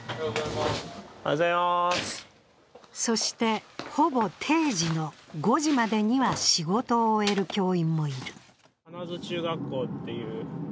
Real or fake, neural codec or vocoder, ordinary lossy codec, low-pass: real; none; none; none